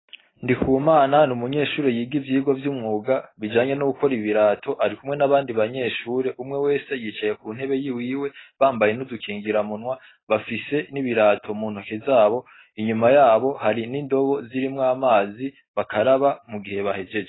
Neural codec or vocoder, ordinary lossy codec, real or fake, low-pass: none; AAC, 16 kbps; real; 7.2 kHz